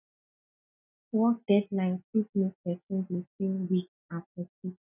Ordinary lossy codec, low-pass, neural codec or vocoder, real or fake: none; 3.6 kHz; none; real